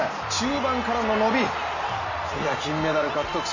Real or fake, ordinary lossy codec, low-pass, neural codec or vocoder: real; none; 7.2 kHz; none